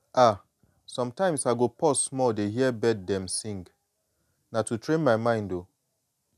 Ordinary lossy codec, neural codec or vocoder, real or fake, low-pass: none; none; real; 14.4 kHz